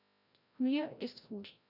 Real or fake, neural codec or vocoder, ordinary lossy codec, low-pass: fake; codec, 16 kHz, 0.5 kbps, FreqCodec, larger model; none; 5.4 kHz